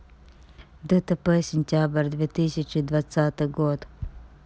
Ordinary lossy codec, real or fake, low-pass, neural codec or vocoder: none; real; none; none